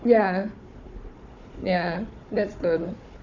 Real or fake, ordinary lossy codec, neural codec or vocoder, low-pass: fake; none; codec, 16 kHz, 4 kbps, FunCodec, trained on Chinese and English, 50 frames a second; 7.2 kHz